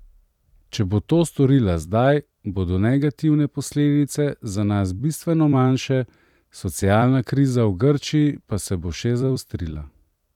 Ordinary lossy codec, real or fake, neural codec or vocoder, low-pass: none; fake; vocoder, 44.1 kHz, 128 mel bands every 256 samples, BigVGAN v2; 19.8 kHz